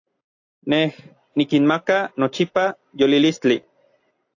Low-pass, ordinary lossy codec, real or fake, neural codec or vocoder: 7.2 kHz; AAC, 48 kbps; real; none